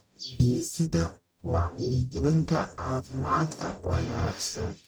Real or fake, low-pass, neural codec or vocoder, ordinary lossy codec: fake; none; codec, 44.1 kHz, 0.9 kbps, DAC; none